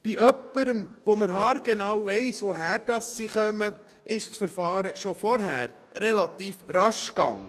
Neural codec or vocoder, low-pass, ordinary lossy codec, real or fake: codec, 44.1 kHz, 2.6 kbps, DAC; 14.4 kHz; none; fake